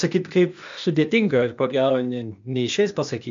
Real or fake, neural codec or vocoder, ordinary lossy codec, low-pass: fake; codec, 16 kHz, 0.8 kbps, ZipCodec; MP3, 64 kbps; 7.2 kHz